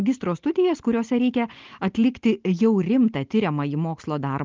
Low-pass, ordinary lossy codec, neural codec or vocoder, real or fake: 7.2 kHz; Opus, 32 kbps; none; real